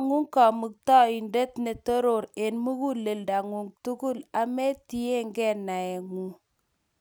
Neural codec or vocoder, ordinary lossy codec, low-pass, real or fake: none; none; none; real